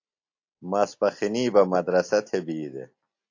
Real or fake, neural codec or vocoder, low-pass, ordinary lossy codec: real; none; 7.2 kHz; AAC, 48 kbps